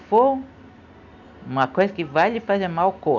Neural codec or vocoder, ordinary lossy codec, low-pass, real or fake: none; none; 7.2 kHz; real